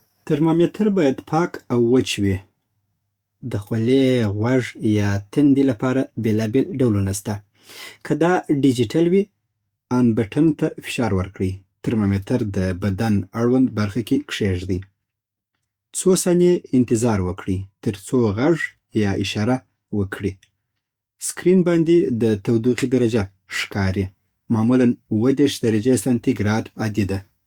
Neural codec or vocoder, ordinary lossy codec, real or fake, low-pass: codec, 44.1 kHz, 7.8 kbps, DAC; Opus, 64 kbps; fake; 19.8 kHz